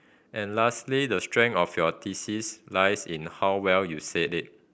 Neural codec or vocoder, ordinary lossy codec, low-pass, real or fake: none; none; none; real